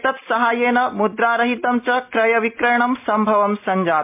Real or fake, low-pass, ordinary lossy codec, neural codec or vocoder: real; 3.6 kHz; MP3, 32 kbps; none